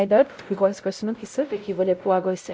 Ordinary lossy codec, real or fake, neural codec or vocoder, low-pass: none; fake; codec, 16 kHz, 0.5 kbps, X-Codec, WavLM features, trained on Multilingual LibriSpeech; none